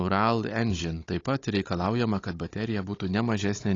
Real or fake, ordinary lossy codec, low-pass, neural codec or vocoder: fake; AAC, 32 kbps; 7.2 kHz; codec, 16 kHz, 16 kbps, FunCodec, trained on Chinese and English, 50 frames a second